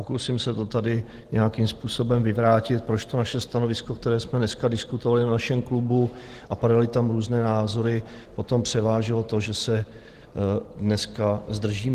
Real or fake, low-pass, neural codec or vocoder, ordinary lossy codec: real; 14.4 kHz; none; Opus, 16 kbps